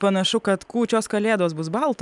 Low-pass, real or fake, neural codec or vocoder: 10.8 kHz; real; none